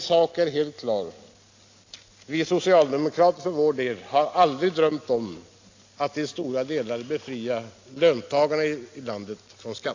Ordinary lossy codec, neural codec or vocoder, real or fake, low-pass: AAC, 48 kbps; none; real; 7.2 kHz